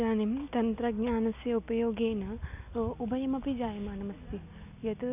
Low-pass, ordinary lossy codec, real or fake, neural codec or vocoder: 3.6 kHz; none; real; none